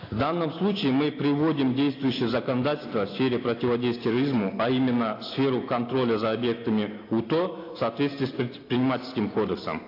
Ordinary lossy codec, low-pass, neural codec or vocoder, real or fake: AAC, 32 kbps; 5.4 kHz; none; real